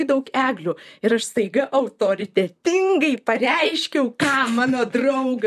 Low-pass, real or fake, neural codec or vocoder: 14.4 kHz; fake; vocoder, 44.1 kHz, 128 mel bands, Pupu-Vocoder